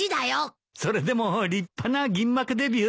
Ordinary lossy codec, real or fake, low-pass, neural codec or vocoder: none; real; none; none